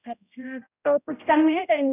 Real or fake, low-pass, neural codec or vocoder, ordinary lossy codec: fake; 3.6 kHz; codec, 16 kHz, 0.5 kbps, X-Codec, HuBERT features, trained on general audio; none